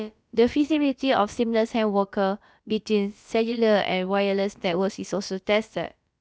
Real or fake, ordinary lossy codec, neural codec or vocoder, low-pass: fake; none; codec, 16 kHz, about 1 kbps, DyCAST, with the encoder's durations; none